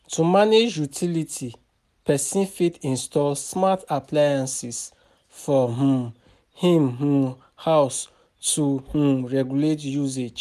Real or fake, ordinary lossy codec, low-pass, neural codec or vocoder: real; none; 14.4 kHz; none